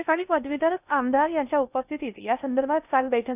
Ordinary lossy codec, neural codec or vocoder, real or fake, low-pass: none; codec, 16 kHz in and 24 kHz out, 0.6 kbps, FocalCodec, streaming, 2048 codes; fake; 3.6 kHz